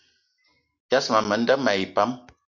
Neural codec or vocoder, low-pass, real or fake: none; 7.2 kHz; real